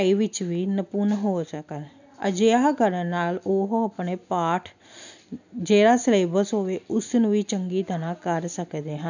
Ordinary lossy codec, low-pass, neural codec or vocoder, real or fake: none; 7.2 kHz; none; real